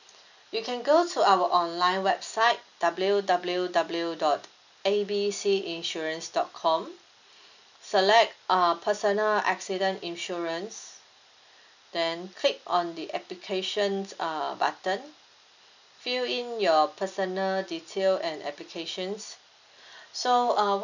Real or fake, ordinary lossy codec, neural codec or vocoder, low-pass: real; none; none; 7.2 kHz